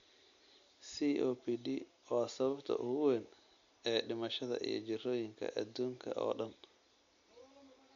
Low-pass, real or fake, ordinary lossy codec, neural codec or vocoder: 7.2 kHz; real; MP3, 64 kbps; none